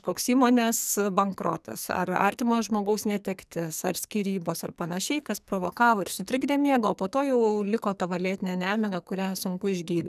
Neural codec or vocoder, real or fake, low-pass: codec, 44.1 kHz, 2.6 kbps, SNAC; fake; 14.4 kHz